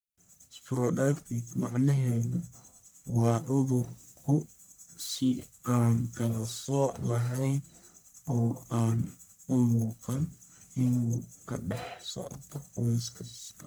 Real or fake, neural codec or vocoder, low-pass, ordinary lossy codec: fake; codec, 44.1 kHz, 1.7 kbps, Pupu-Codec; none; none